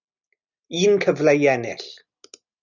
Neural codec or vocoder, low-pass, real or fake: none; 7.2 kHz; real